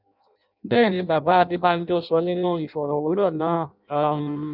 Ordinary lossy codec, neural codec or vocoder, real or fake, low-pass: none; codec, 16 kHz in and 24 kHz out, 0.6 kbps, FireRedTTS-2 codec; fake; 5.4 kHz